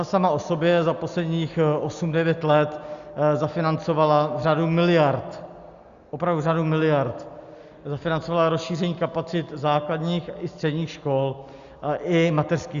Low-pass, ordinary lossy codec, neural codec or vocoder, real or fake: 7.2 kHz; Opus, 64 kbps; none; real